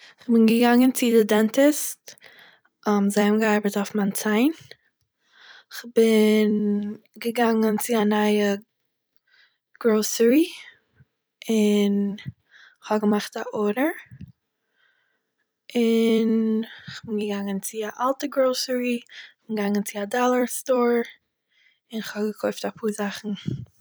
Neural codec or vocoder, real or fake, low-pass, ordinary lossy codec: vocoder, 44.1 kHz, 128 mel bands every 256 samples, BigVGAN v2; fake; none; none